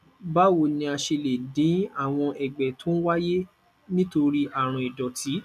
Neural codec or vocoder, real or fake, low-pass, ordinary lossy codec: none; real; 14.4 kHz; none